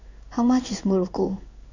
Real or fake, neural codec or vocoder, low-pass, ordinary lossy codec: fake; codec, 16 kHz in and 24 kHz out, 1 kbps, XY-Tokenizer; 7.2 kHz; none